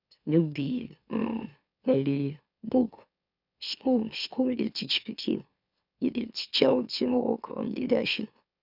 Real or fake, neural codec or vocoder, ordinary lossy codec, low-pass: fake; autoencoder, 44.1 kHz, a latent of 192 numbers a frame, MeloTTS; none; 5.4 kHz